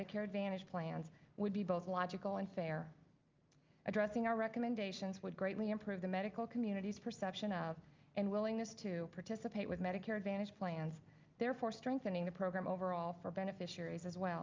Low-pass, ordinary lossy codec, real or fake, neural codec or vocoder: 7.2 kHz; Opus, 24 kbps; real; none